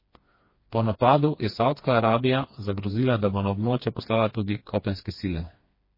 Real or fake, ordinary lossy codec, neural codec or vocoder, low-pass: fake; MP3, 24 kbps; codec, 16 kHz, 2 kbps, FreqCodec, smaller model; 5.4 kHz